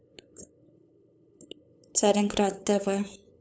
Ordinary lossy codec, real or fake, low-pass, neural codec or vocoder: none; fake; none; codec, 16 kHz, 8 kbps, FunCodec, trained on LibriTTS, 25 frames a second